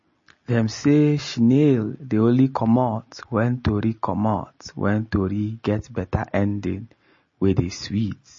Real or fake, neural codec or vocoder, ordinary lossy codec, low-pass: real; none; MP3, 32 kbps; 7.2 kHz